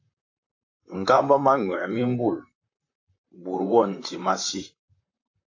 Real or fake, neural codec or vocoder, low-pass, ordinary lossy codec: fake; vocoder, 44.1 kHz, 128 mel bands, Pupu-Vocoder; 7.2 kHz; AAC, 48 kbps